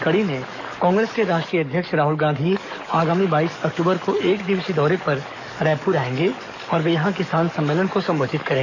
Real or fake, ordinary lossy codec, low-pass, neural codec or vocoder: fake; none; 7.2 kHz; codec, 44.1 kHz, 7.8 kbps, DAC